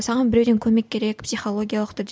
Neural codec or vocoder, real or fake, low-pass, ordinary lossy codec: none; real; none; none